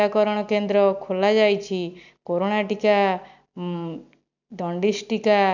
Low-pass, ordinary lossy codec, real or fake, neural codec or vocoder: 7.2 kHz; none; real; none